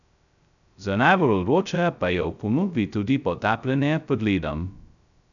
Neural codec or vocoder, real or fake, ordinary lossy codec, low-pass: codec, 16 kHz, 0.2 kbps, FocalCodec; fake; none; 7.2 kHz